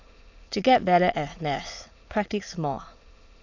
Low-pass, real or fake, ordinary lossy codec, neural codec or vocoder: 7.2 kHz; fake; AAC, 48 kbps; autoencoder, 22.05 kHz, a latent of 192 numbers a frame, VITS, trained on many speakers